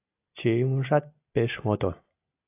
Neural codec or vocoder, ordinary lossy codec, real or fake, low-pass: none; AAC, 24 kbps; real; 3.6 kHz